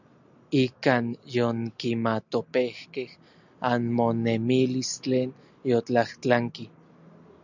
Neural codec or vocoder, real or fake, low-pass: none; real; 7.2 kHz